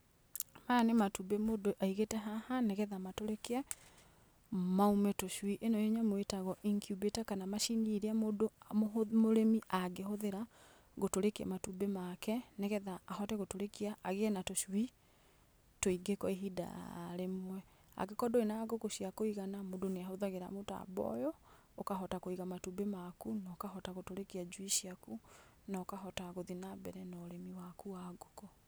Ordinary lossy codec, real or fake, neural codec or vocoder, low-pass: none; real; none; none